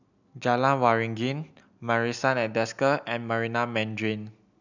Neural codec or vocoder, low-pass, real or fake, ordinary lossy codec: none; 7.2 kHz; real; none